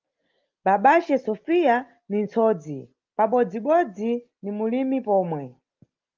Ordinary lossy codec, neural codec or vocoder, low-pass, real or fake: Opus, 32 kbps; none; 7.2 kHz; real